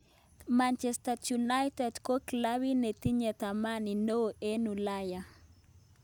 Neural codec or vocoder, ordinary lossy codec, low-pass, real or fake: none; none; none; real